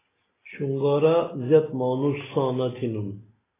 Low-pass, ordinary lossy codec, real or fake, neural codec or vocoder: 3.6 kHz; AAC, 16 kbps; real; none